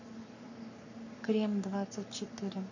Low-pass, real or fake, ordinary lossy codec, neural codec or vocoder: 7.2 kHz; fake; none; codec, 44.1 kHz, 7.8 kbps, Pupu-Codec